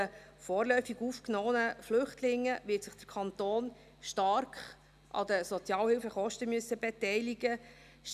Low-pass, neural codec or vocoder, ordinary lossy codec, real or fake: 14.4 kHz; none; none; real